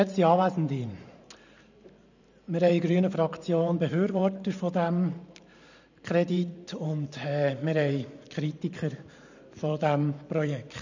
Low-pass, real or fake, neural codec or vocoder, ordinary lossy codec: 7.2 kHz; fake; vocoder, 44.1 kHz, 128 mel bands every 512 samples, BigVGAN v2; none